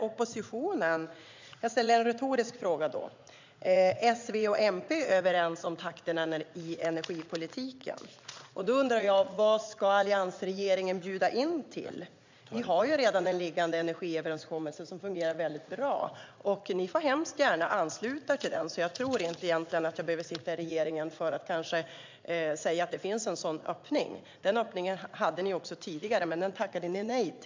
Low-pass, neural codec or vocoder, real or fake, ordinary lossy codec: 7.2 kHz; vocoder, 44.1 kHz, 80 mel bands, Vocos; fake; MP3, 64 kbps